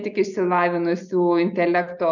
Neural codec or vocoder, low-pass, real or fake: none; 7.2 kHz; real